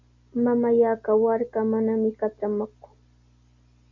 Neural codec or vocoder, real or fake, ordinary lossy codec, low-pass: none; real; MP3, 64 kbps; 7.2 kHz